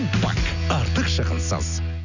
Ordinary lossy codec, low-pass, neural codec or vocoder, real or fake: none; 7.2 kHz; none; real